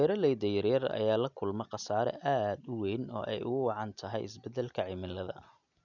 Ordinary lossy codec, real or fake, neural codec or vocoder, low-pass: none; real; none; 7.2 kHz